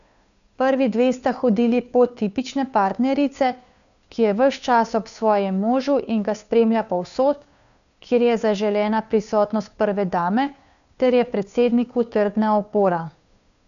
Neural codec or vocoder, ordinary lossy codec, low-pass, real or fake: codec, 16 kHz, 2 kbps, FunCodec, trained on Chinese and English, 25 frames a second; none; 7.2 kHz; fake